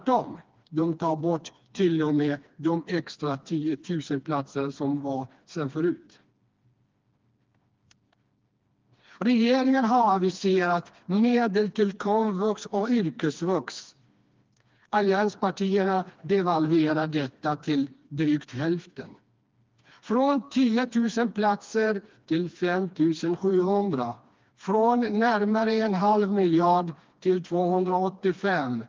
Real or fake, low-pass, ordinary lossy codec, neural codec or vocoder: fake; 7.2 kHz; Opus, 32 kbps; codec, 16 kHz, 2 kbps, FreqCodec, smaller model